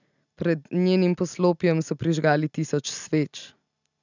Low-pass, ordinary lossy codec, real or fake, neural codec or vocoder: 7.2 kHz; none; real; none